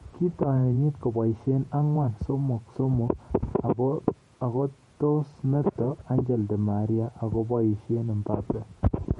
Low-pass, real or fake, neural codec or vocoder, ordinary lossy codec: 19.8 kHz; fake; vocoder, 48 kHz, 128 mel bands, Vocos; MP3, 48 kbps